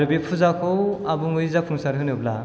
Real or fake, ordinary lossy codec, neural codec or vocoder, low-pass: real; none; none; none